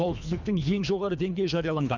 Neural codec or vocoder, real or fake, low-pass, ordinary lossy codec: codec, 24 kHz, 3 kbps, HILCodec; fake; 7.2 kHz; none